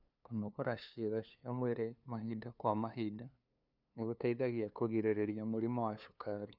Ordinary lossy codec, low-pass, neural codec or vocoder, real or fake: none; 5.4 kHz; codec, 16 kHz, 2 kbps, FunCodec, trained on LibriTTS, 25 frames a second; fake